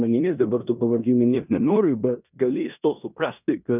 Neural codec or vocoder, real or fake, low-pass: codec, 16 kHz in and 24 kHz out, 0.9 kbps, LongCat-Audio-Codec, four codebook decoder; fake; 3.6 kHz